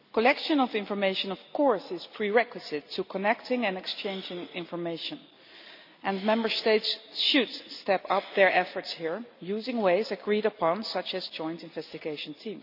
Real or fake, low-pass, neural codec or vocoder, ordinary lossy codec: real; 5.4 kHz; none; none